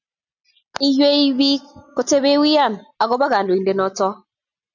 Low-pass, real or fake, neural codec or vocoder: 7.2 kHz; real; none